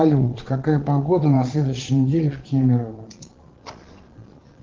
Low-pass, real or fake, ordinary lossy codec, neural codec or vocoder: 7.2 kHz; fake; Opus, 16 kbps; vocoder, 22.05 kHz, 80 mel bands, Vocos